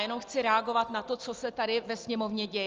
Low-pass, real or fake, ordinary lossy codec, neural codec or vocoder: 7.2 kHz; real; Opus, 24 kbps; none